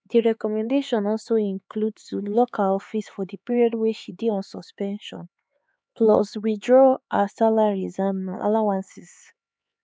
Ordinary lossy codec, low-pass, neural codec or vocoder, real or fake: none; none; codec, 16 kHz, 4 kbps, X-Codec, HuBERT features, trained on LibriSpeech; fake